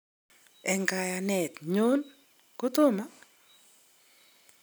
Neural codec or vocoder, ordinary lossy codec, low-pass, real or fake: none; none; none; real